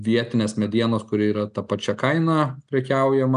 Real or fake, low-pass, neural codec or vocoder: real; 9.9 kHz; none